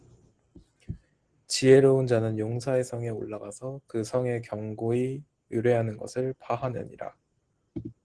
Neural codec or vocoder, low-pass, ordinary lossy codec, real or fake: none; 9.9 kHz; Opus, 16 kbps; real